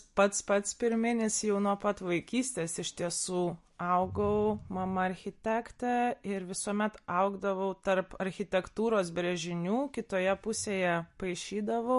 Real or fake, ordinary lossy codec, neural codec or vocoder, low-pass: real; MP3, 48 kbps; none; 14.4 kHz